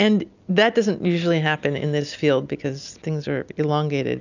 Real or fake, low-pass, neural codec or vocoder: real; 7.2 kHz; none